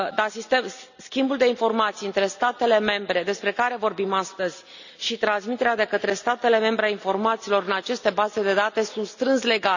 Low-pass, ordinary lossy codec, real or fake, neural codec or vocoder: 7.2 kHz; none; real; none